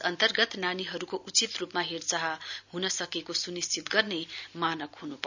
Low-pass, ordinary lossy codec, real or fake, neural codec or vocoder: 7.2 kHz; none; real; none